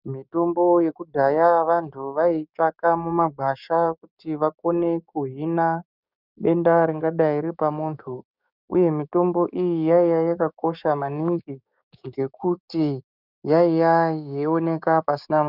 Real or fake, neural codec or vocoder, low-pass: fake; codec, 16 kHz, 6 kbps, DAC; 5.4 kHz